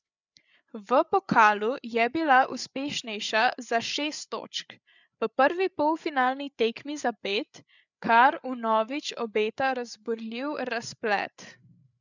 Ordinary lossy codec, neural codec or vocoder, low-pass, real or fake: none; codec, 16 kHz, 8 kbps, FreqCodec, larger model; 7.2 kHz; fake